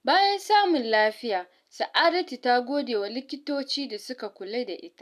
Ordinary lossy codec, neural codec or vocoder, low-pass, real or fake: none; none; 14.4 kHz; real